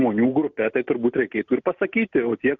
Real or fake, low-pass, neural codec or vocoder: real; 7.2 kHz; none